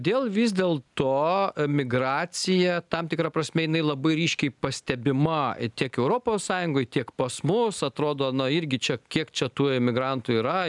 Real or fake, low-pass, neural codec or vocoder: real; 10.8 kHz; none